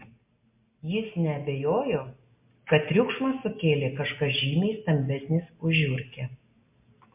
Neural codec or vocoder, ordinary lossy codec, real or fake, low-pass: none; MP3, 32 kbps; real; 3.6 kHz